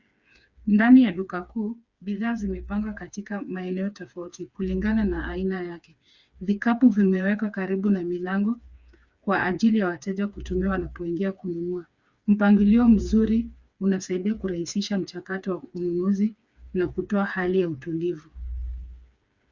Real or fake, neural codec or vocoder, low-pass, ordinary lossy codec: fake; codec, 16 kHz, 4 kbps, FreqCodec, smaller model; 7.2 kHz; Opus, 64 kbps